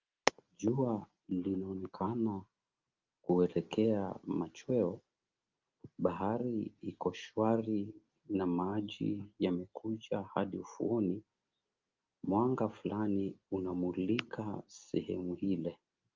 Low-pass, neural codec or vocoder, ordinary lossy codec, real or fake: 7.2 kHz; none; Opus, 24 kbps; real